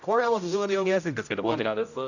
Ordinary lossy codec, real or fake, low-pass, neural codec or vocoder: none; fake; 7.2 kHz; codec, 16 kHz, 0.5 kbps, X-Codec, HuBERT features, trained on general audio